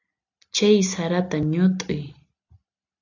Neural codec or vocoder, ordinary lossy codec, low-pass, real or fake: none; AAC, 48 kbps; 7.2 kHz; real